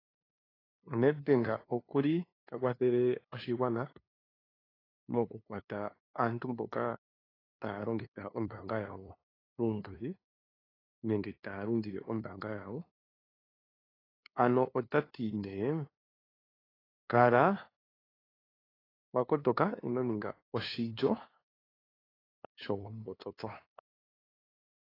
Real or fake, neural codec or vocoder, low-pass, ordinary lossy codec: fake; codec, 16 kHz, 2 kbps, FunCodec, trained on LibriTTS, 25 frames a second; 5.4 kHz; AAC, 24 kbps